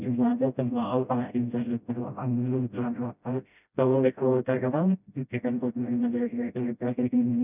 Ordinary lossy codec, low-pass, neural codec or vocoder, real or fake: none; 3.6 kHz; codec, 16 kHz, 0.5 kbps, FreqCodec, smaller model; fake